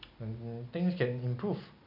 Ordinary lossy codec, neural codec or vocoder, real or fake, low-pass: MP3, 48 kbps; none; real; 5.4 kHz